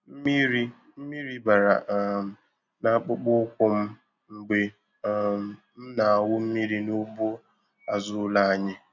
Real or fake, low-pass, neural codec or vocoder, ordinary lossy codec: real; 7.2 kHz; none; none